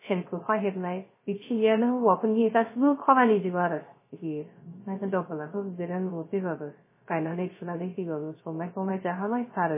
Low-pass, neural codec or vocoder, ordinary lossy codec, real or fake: 3.6 kHz; codec, 16 kHz, 0.3 kbps, FocalCodec; MP3, 16 kbps; fake